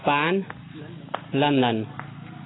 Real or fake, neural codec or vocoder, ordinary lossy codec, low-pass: real; none; AAC, 16 kbps; 7.2 kHz